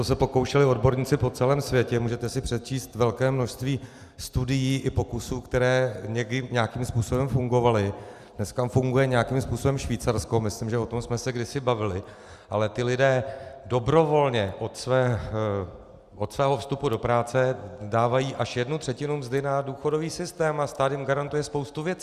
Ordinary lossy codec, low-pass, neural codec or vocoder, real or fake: Opus, 64 kbps; 14.4 kHz; none; real